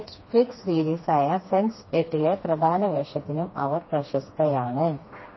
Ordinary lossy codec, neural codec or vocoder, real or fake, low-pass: MP3, 24 kbps; codec, 16 kHz, 2 kbps, FreqCodec, smaller model; fake; 7.2 kHz